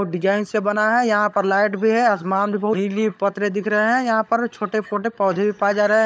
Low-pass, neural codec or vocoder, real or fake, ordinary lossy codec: none; codec, 16 kHz, 16 kbps, FunCodec, trained on LibriTTS, 50 frames a second; fake; none